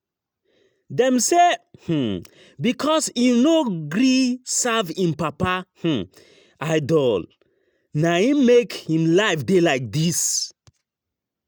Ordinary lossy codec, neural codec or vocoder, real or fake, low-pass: none; none; real; none